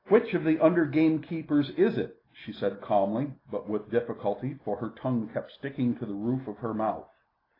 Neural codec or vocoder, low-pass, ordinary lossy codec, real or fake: none; 5.4 kHz; AAC, 24 kbps; real